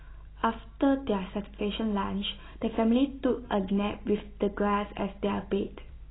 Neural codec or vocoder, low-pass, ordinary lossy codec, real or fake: none; 7.2 kHz; AAC, 16 kbps; real